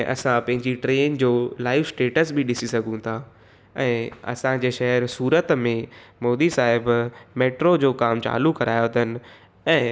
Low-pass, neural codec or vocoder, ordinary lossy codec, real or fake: none; none; none; real